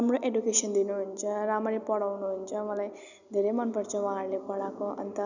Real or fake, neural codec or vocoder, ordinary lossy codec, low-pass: real; none; none; 7.2 kHz